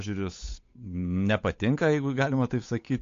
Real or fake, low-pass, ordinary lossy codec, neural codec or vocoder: fake; 7.2 kHz; AAC, 48 kbps; codec, 16 kHz, 8 kbps, FunCodec, trained on Chinese and English, 25 frames a second